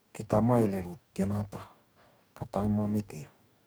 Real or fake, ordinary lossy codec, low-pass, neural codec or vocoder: fake; none; none; codec, 44.1 kHz, 2.6 kbps, DAC